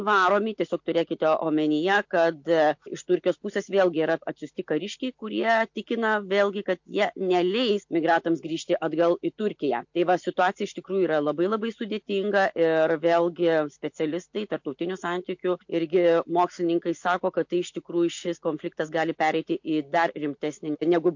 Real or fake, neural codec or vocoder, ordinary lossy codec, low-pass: real; none; MP3, 48 kbps; 7.2 kHz